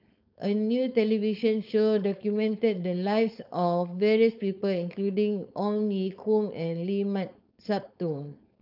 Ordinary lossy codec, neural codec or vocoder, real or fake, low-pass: none; codec, 16 kHz, 4.8 kbps, FACodec; fake; 5.4 kHz